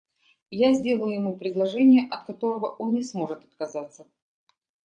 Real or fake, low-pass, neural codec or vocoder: fake; 9.9 kHz; vocoder, 22.05 kHz, 80 mel bands, Vocos